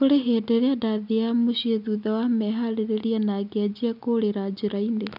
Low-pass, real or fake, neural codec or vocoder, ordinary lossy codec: 5.4 kHz; real; none; none